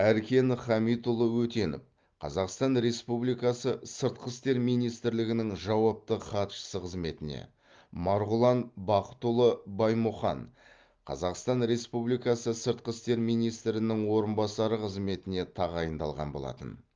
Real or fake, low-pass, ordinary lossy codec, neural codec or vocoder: real; 7.2 kHz; Opus, 32 kbps; none